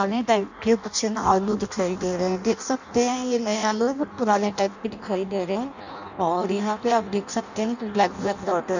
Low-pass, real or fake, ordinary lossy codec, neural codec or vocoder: 7.2 kHz; fake; none; codec, 16 kHz in and 24 kHz out, 0.6 kbps, FireRedTTS-2 codec